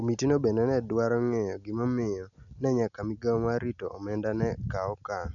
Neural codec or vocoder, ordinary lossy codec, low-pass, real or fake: none; none; 7.2 kHz; real